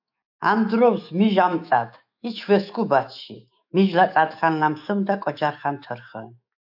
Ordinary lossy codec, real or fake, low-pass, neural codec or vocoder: AAC, 48 kbps; fake; 5.4 kHz; autoencoder, 48 kHz, 128 numbers a frame, DAC-VAE, trained on Japanese speech